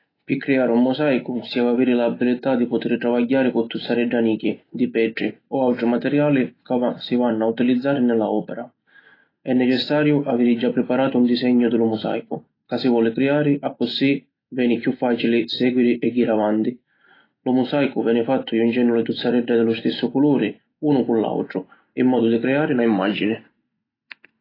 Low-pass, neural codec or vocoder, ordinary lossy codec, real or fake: 5.4 kHz; none; AAC, 24 kbps; real